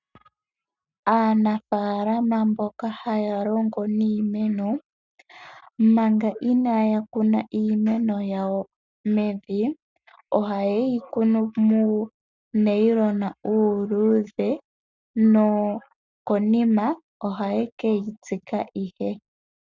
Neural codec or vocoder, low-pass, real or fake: none; 7.2 kHz; real